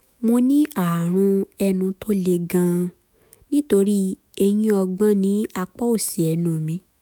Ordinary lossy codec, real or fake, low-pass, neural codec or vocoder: none; fake; none; autoencoder, 48 kHz, 128 numbers a frame, DAC-VAE, trained on Japanese speech